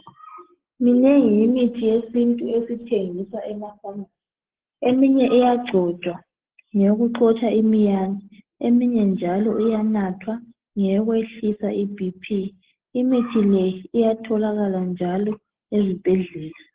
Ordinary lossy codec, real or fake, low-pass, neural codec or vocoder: Opus, 16 kbps; real; 3.6 kHz; none